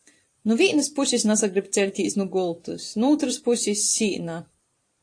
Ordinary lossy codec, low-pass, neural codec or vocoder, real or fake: AAC, 48 kbps; 9.9 kHz; vocoder, 44.1 kHz, 128 mel bands every 512 samples, BigVGAN v2; fake